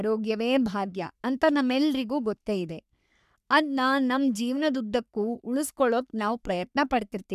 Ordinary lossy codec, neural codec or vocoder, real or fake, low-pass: none; codec, 44.1 kHz, 3.4 kbps, Pupu-Codec; fake; 14.4 kHz